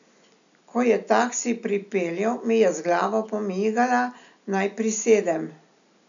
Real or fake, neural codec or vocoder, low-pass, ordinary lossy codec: real; none; 7.2 kHz; none